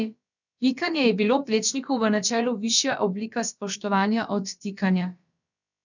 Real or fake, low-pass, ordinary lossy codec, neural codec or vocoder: fake; 7.2 kHz; none; codec, 16 kHz, about 1 kbps, DyCAST, with the encoder's durations